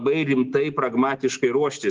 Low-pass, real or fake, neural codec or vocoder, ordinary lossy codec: 7.2 kHz; real; none; Opus, 16 kbps